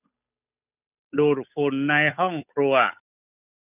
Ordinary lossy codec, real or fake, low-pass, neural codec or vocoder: none; fake; 3.6 kHz; codec, 16 kHz, 8 kbps, FunCodec, trained on Chinese and English, 25 frames a second